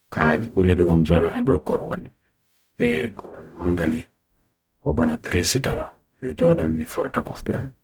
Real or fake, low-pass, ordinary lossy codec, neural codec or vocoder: fake; 19.8 kHz; none; codec, 44.1 kHz, 0.9 kbps, DAC